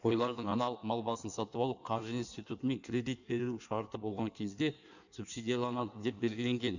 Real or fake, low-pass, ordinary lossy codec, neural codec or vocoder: fake; 7.2 kHz; none; codec, 16 kHz in and 24 kHz out, 1.1 kbps, FireRedTTS-2 codec